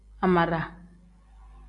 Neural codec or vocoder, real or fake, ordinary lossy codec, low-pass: none; real; AAC, 48 kbps; 10.8 kHz